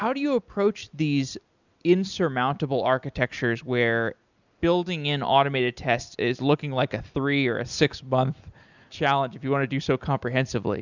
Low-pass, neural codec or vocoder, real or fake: 7.2 kHz; none; real